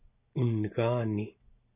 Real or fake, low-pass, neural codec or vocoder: real; 3.6 kHz; none